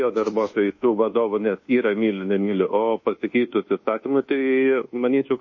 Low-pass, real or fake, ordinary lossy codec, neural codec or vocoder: 7.2 kHz; fake; MP3, 32 kbps; codec, 24 kHz, 1.2 kbps, DualCodec